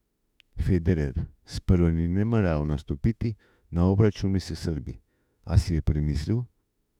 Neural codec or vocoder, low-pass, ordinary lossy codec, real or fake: autoencoder, 48 kHz, 32 numbers a frame, DAC-VAE, trained on Japanese speech; 19.8 kHz; none; fake